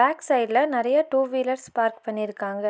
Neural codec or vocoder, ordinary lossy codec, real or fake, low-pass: none; none; real; none